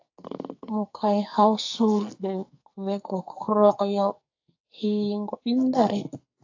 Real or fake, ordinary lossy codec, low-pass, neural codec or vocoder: fake; AAC, 48 kbps; 7.2 kHz; codec, 44.1 kHz, 2.6 kbps, SNAC